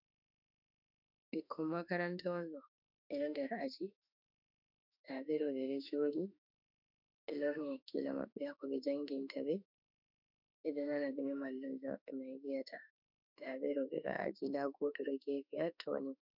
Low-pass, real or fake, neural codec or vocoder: 5.4 kHz; fake; autoencoder, 48 kHz, 32 numbers a frame, DAC-VAE, trained on Japanese speech